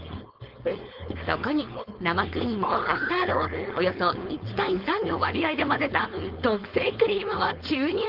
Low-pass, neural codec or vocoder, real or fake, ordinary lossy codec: 5.4 kHz; codec, 16 kHz, 4.8 kbps, FACodec; fake; Opus, 32 kbps